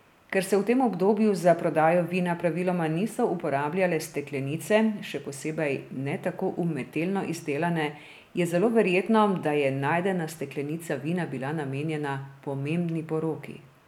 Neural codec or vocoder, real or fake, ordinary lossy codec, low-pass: none; real; none; 19.8 kHz